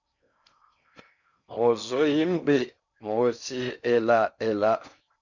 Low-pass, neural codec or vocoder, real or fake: 7.2 kHz; codec, 16 kHz in and 24 kHz out, 0.6 kbps, FocalCodec, streaming, 4096 codes; fake